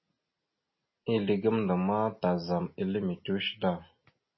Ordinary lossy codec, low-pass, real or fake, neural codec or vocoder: MP3, 24 kbps; 7.2 kHz; real; none